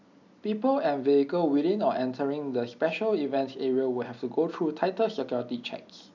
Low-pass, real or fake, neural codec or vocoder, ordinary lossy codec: 7.2 kHz; real; none; none